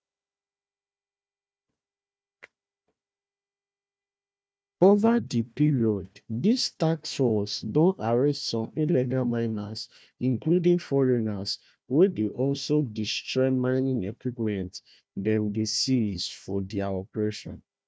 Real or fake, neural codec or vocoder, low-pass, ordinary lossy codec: fake; codec, 16 kHz, 1 kbps, FunCodec, trained on Chinese and English, 50 frames a second; none; none